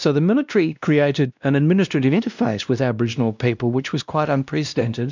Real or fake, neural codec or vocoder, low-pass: fake; codec, 16 kHz, 1 kbps, X-Codec, WavLM features, trained on Multilingual LibriSpeech; 7.2 kHz